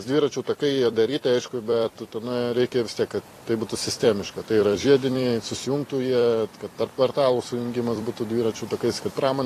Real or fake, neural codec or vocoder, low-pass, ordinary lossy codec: fake; vocoder, 44.1 kHz, 128 mel bands every 256 samples, BigVGAN v2; 14.4 kHz; AAC, 48 kbps